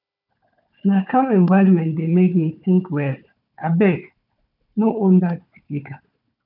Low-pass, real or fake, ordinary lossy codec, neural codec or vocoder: 5.4 kHz; fake; none; codec, 16 kHz, 4 kbps, FunCodec, trained on Chinese and English, 50 frames a second